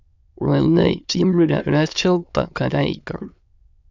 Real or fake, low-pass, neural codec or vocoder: fake; 7.2 kHz; autoencoder, 22.05 kHz, a latent of 192 numbers a frame, VITS, trained on many speakers